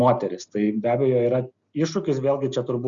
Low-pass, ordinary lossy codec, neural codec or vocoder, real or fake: 7.2 kHz; Opus, 64 kbps; none; real